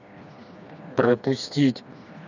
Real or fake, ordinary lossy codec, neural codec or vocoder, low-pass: fake; none; codec, 16 kHz, 2 kbps, FreqCodec, smaller model; 7.2 kHz